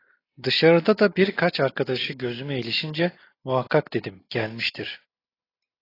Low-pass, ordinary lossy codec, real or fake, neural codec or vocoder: 5.4 kHz; AAC, 24 kbps; real; none